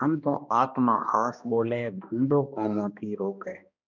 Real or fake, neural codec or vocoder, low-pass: fake; codec, 16 kHz, 1 kbps, X-Codec, HuBERT features, trained on balanced general audio; 7.2 kHz